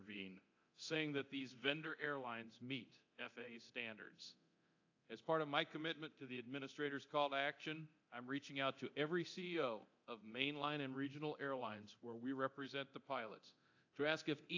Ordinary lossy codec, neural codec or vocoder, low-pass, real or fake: AAC, 48 kbps; codec, 24 kHz, 0.9 kbps, DualCodec; 7.2 kHz; fake